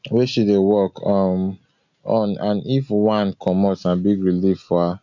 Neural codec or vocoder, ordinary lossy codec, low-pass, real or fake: none; MP3, 64 kbps; 7.2 kHz; real